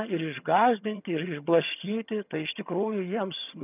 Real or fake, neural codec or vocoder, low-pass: fake; vocoder, 22.05 kHz, 80 mel bands, HiFi-GAN; 3.6 kHz